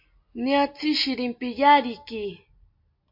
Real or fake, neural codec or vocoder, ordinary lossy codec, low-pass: real; none; MP3, 32 kbps; 5.4 kHz